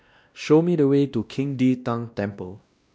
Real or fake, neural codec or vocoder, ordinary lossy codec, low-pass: fake; codec, 16 kHz, 1 kbps, X-Codec, WavLM features, trained on Multilingual LibriSpeech; none; none